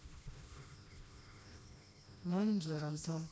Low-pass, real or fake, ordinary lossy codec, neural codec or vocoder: none; fake; none; codec, 16 kHz, 1 kbps, FreqCodec, smaller model